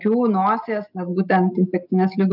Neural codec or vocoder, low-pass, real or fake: none; 5.4 kHz; real